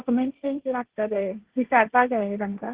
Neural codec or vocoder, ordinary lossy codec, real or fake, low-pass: codec, 16 kHz, 1.1 kbps, Voila-Tokenizer; Opus, 16 kbps; fake; 3.6 kHz